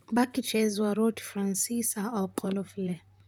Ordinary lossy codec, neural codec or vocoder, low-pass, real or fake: none; vocoder, 44.1 kHz, 128 mel bands, Pupu-Vocoder; none; fake